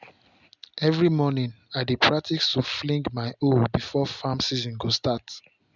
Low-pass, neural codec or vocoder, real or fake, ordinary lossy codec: 7.2 kHz; none; real; none